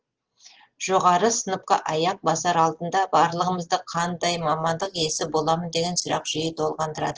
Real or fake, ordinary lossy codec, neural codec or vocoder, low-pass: real; Opus, 16 kbps; none; 7.2 kHz